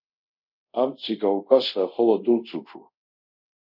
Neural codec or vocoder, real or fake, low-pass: codec, 24 kHz, 0.5 kbps, DualCodec; fake; 5.4 kHz